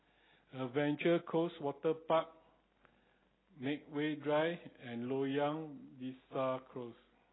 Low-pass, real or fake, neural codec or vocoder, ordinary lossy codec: 7.2 kHz; real; none; AAC, 16 kbps